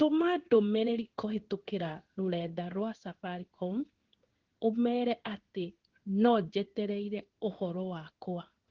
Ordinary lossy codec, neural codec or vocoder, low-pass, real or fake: Opus, 16 kbps; codec, 16 kHz in and 24 kHz out, 1 kbps, XY-Tokenizer; 7.2 kHz; fake